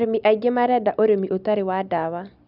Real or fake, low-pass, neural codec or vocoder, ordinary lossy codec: real; 5.4 kHz; none; none